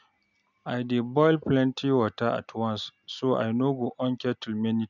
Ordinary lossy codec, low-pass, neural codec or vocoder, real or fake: none; 7.2 kHz; none; real